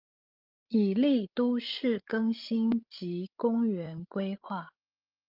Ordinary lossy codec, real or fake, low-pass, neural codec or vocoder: Opus, 32 kbps; fake; 5.4 kHz; codec, 16 kHz, 16 kbps, FreqCodec, larger model